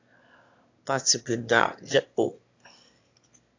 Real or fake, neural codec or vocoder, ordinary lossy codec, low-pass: fake; autoencoder, 22.05 kHz, a latent of 192 numbers a frame, VITS, trained on one speaker; AAC, 48 kbps; 7.2 kHz